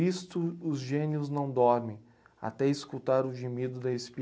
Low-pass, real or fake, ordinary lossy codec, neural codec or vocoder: none; real; none; none